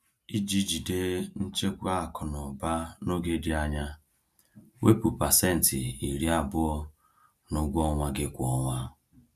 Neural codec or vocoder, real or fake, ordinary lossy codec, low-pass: none; real; none; 14.4 kHz